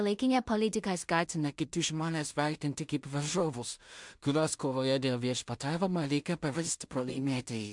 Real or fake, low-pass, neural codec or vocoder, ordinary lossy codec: fake; 10.8 kHz; codec, 16 kHz in and 24 kHz out, 0.4 kbps, LongCat-Audio-Codec, two codebook decoder; MP3, 64 kbps